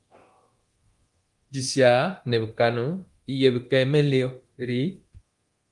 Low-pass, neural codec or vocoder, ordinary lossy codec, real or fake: 10.8 kHz; codec, 24 kHz, 0.9 kbps, DualCodec; Opus, 24 kbps; fake